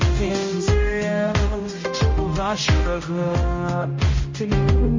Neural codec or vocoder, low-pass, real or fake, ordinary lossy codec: codec, 16 kHz, 0.5 kbps, X-Codec, HuBERT features, trained on general audio; 7.2 kHz; fake; MP3, 32 kbps